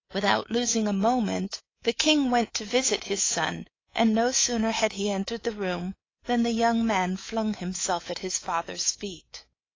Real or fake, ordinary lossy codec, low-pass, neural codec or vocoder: fake; AAC, 32 kbps; 7.2 kHz; codec, 24 kHz, 3.1 kbps, DualCodec